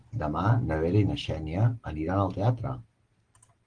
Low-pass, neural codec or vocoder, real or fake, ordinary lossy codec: 9.9 kHz; none; real; Opus, 16 kbps